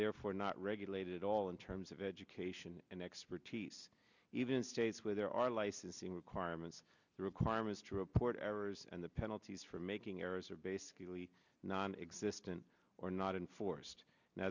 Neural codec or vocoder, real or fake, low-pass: none; real; 7.2 kHz